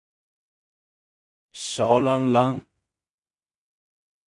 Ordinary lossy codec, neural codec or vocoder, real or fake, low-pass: MP3, 48 kbps; codec, 16 kHz in and 24 kHz out, 0.4 kbps, LongCat-Audio-Codec, two codebook decoder; fake; 10.8 kHz